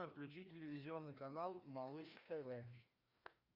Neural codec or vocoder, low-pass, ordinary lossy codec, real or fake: codec, 16 kHz, 1 kbps, FreqCodec, larger model; 5.4 kHz; Opus, 64 kbps; fake